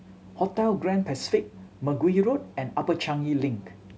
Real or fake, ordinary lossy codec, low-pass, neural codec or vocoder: real; none; none; none